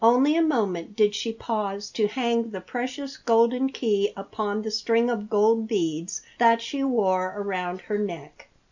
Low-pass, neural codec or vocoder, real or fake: 7.2 kHz; none; real